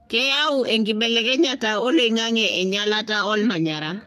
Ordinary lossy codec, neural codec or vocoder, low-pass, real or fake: AAC, 96 kbps; codec, 32 kHz, 1.9 kbps, SNAC; 14.4 kHz; fake